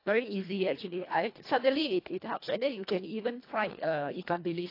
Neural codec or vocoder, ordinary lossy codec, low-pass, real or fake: codec, 24 kHz, 1.5 kbps, HILCodec; AAC, 32 kbps; 5.4 kHz; fake